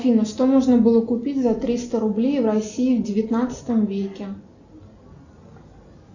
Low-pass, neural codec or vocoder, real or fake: 7.2 kHz; none; real